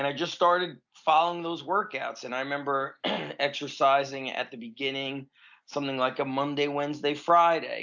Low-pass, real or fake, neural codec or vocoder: 7.2 kHz; real; none